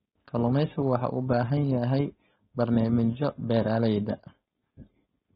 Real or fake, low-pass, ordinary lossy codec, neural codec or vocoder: fake; 7.2 kHz; AAC, 16 kbps; codec, 16 kHz, 4.8 kbps, FACodec